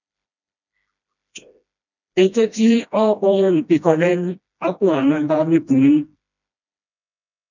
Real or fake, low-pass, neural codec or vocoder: fake; 7.2 kHz; codec, 16 kHz, 1 kbps, FreqCodec, smaller model